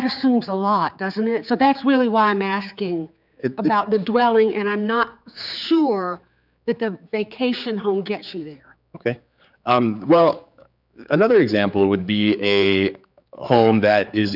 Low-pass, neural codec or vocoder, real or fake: 5.4 kHz; codec, 16 kHz, 4 kbps, X-Codec, HuBERT features, trained on general audio; fake